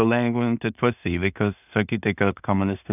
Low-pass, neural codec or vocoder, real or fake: 3.6 kHz; codec, 16 kHz in and 24 kHz out, 0.4 kbps, LongCat-Audio-Codec, two codebook decoder; fake